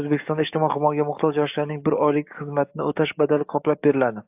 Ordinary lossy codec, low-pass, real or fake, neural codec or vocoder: AAC, 32 kbps; 3.6 kHz; fake; codec, 44.1 kHz, 7.8 kbps, DAC